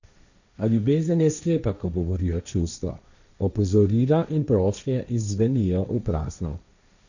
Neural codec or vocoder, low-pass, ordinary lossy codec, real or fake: codec, 16 kHz, 1.1 kbps, Voila-Tokenizer; 7.2 kHz; none; fake